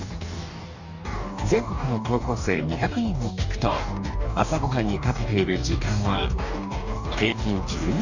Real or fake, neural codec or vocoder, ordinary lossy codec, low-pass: fake; codec, 44.1 kHz, 2.6 kbps, DAC; none; 7.2 kHz